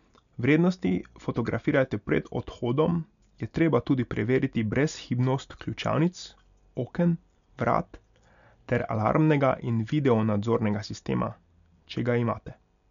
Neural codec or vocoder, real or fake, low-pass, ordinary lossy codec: none; real; 7.2 kHz; none